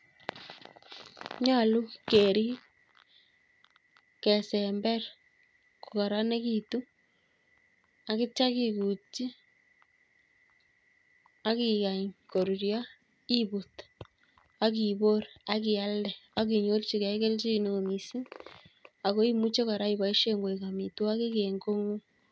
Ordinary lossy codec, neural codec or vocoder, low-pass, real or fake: none; none; none; real